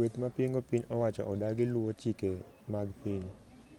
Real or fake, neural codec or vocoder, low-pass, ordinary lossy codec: fake; vocoder, 44.1 kHz, 128 mel bands every 512 samples, BigVGAN v2; 19.8 kHz; Opus, 32 kbps